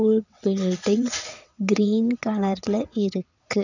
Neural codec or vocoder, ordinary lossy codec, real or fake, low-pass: vocoder, 22.05 kHz, 80 mel bands, WaveNeXt; none; fake; 7.2 kHz